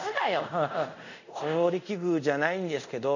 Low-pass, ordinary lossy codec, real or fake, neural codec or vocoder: 7.2 kHz; none; fake; codec, 24 kHz, 0.5 kbps, DualCodec